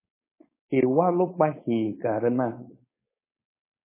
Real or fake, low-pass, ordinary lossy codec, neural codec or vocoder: fake; 3.6 kHz; MP3, 16 kbps; codec, 16 kHz, 4.8 kbps, FACodec